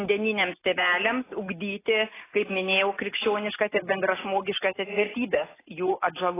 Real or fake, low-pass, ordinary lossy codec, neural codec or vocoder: real; 3.6 kHz; AAC, 16 kbps; none